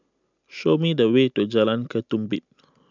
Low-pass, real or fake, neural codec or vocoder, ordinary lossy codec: 7.2 kHz; real; none; MP3, 64 kbps